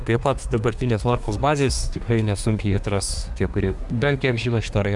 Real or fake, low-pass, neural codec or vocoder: fake; 10.8 kHz; codec, 24 kHz, 1 kbps, SNAC